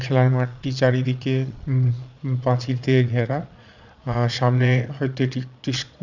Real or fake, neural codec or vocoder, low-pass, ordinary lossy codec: fake; vocoder, 22.05 kHz, 80 mel bands, Vocos; 7.2 kHz; none